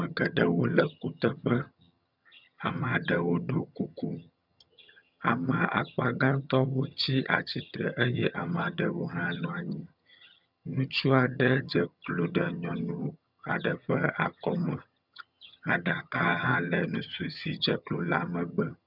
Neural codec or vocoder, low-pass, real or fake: vocoder, 22.05 kHz, 80 mel bands, HiFi-GAN; 5.4 kHz; fake